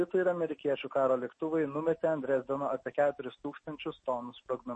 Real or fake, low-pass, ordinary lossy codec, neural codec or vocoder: real; 9.9 kHz; MP3, 32 kbps; none